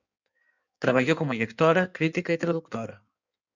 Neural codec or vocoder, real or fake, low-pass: codec, 16 kHz in and 24 kHz out, 1.1 kbps, FireRedTTS-2 codec; fake; 7.2 kHz